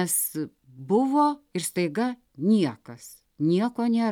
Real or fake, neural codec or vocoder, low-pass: real; none; 19.8 kHz